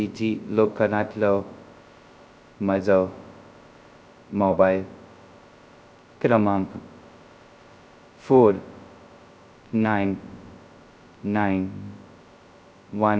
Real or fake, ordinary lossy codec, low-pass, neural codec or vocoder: fake; none; none; codec, 16 kHz, 0.2 kbps, FocalCodec